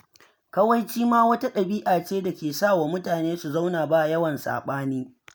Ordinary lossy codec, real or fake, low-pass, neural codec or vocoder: none; real; none; none